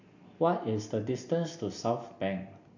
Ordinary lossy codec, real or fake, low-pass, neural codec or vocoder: AAC, 48 kbps; real; 7.2 kHz; none